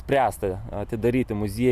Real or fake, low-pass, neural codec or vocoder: real; 14.4 kHz; none